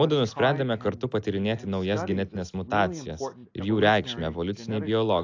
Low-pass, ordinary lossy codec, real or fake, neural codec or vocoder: 7.2 kHz; AAC, 48 kbps; real; none